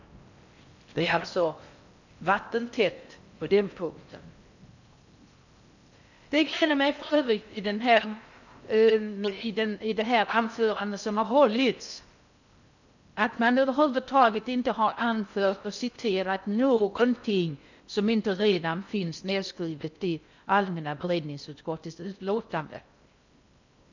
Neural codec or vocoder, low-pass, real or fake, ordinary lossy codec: codec, 16 kHz in and 24 kHz out, 0.6 kbps, FocalCodec, streaming, 4096 codes; 7.2 kHz; fake; none